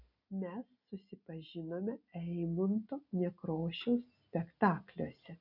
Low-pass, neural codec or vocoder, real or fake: 5.4 kHz; none; real